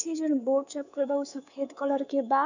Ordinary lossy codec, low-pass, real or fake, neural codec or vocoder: none; 7.2 kHz; fake; codec, 24 kHz, 3.1 kbps, DualCodec